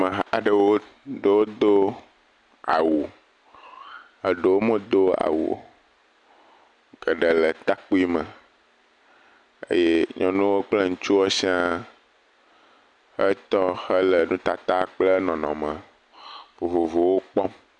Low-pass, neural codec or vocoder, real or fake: 10.8 kHz; none; real